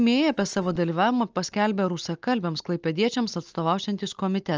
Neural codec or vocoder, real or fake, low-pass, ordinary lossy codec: none; real; 7.2 kHz; Opus, 24 kbps